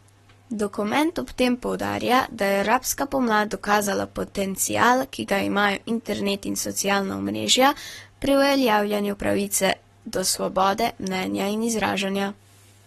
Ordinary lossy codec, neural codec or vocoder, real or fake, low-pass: AAC, 32 kbps; codec, 44.1 kHz, 7.8 kbps, Pupu-Codec; fake; 19.8 kHz